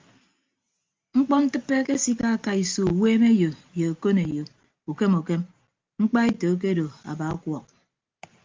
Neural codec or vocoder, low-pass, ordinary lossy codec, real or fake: none; 7.2 kHz; Opus, 32 kbps; real